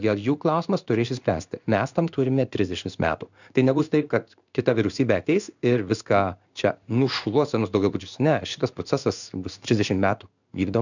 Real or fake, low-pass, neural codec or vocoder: fake; 7.2 kHz; codec, 16 kHz in and 24 kHz out, 1 kbps, XY-Tokenizer